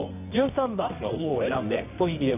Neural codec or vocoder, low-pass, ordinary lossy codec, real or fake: codec, 24 kHz, 0.9 kbps, WavTokenizer, medium music audio release; 3.6 kHz; none; fake